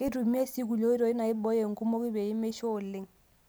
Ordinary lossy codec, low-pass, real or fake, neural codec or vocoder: none; none; real; none